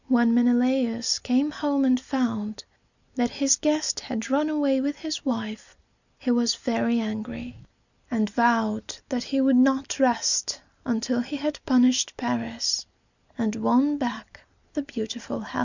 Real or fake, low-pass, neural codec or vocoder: real; 7.2 kHz; none